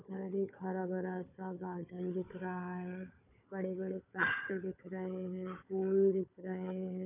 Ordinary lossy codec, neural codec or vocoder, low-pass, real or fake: none; codec, 16 kHz, 4 kbps, FunCodec, trained on Chinese and English, 50 frames a second; 3.6 kHz; fake